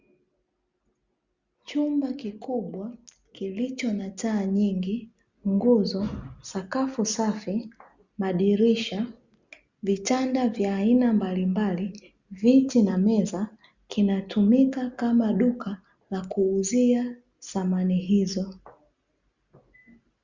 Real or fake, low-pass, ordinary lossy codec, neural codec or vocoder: real; 7.2 kHz; Opus, 64 kbps; none